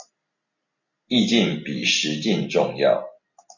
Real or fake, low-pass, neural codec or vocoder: real; 7.2 kHz; none